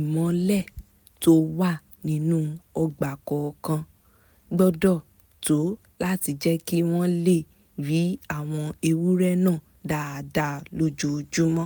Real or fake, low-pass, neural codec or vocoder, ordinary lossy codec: real; none; none; none